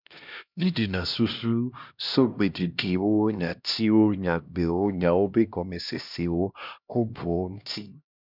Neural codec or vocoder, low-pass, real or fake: codec, 16 kHz, 1 kbps, X-Codec, HuBERT features, trained on LibriSpeech; 5.4 kHz; fake